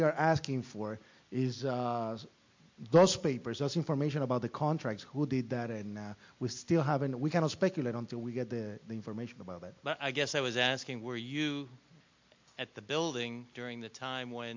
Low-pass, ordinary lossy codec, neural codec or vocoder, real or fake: 7.2 kHz; MP3, 48 kbps; none; real